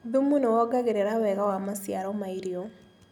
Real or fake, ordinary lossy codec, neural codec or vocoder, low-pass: real; none; none; 19.8 kHz